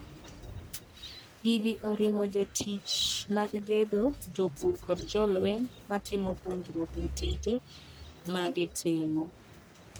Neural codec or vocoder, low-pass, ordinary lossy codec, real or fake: codec, 44.1 kHz, 1.7 kbps, Pupu-Codec; none; none; fake